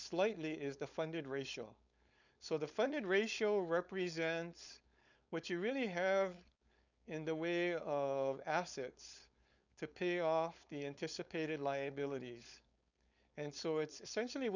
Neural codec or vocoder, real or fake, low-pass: codec, 16 kHz, 4.8 kbps, FACodec; fake; 7.2 kHz